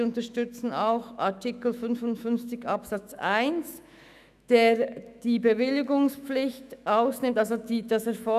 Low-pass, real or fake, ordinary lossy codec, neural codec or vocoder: 14.4 kHz; fake; none; autoencoder, 48 kHz, 128 numbers a frame, DAC-VAE, trained on Japanese speech